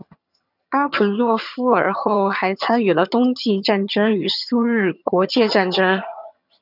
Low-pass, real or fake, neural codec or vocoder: 5.4 kHz; fake; vocoder, 22.05 kHz, 80 mel bands, HiFi-GAN